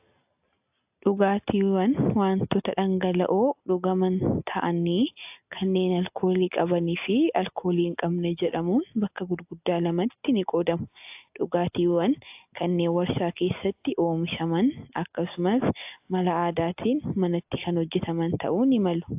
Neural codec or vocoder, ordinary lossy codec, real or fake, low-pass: none; AAC, 32 kbps; real; 3.6 kHz